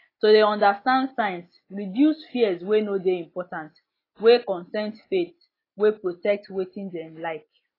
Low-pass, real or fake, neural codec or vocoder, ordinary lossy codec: 5.4 kHz; real; none; AAC, 24 kbps